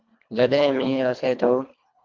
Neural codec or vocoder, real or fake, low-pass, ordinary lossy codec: codec, 24 kHz, 1.5 kbps, HILCodec; fake; 7.2 kHz; MP3, 64 kbps